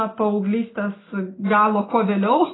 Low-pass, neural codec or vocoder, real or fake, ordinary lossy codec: 7.2 kHz; none; real; AAC, 16 kbps